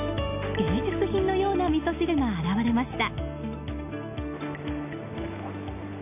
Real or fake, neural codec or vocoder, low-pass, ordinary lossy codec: real; none; 3.6 kHz; none